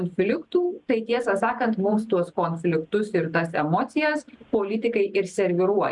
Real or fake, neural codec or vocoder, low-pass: real; none; 10.8 kHz